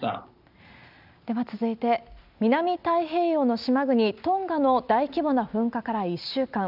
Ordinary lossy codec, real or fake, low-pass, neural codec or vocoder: none; real; 5.4 kHz; none